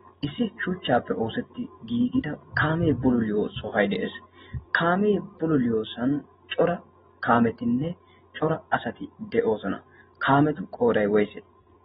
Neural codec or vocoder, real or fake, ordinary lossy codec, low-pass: none; real; AAC, 16 kbps; 19.8 kHz